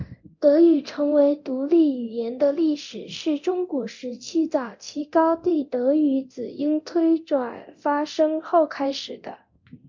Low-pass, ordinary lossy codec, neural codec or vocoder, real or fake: 7.2 kHz; MP3, 48 kbps; codec, 24 kHz, 0.9 kbps, DualCodec; fake